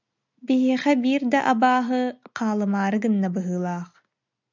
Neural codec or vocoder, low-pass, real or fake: none; 7.2 kHz; real